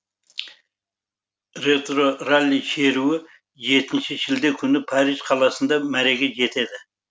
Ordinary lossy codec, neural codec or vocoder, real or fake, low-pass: none; none; real; none